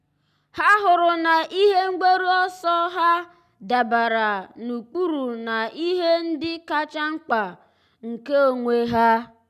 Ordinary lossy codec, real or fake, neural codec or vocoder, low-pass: none; real; none; 14.4 kHz